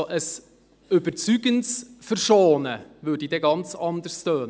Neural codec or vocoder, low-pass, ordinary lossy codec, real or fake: none; none; none; real